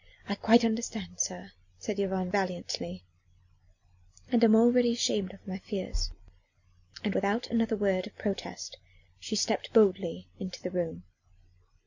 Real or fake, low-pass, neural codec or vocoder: real; 7.2 kHz; none